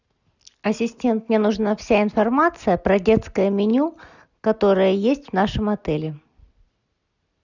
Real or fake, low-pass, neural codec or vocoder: real; 7.2 kHz; none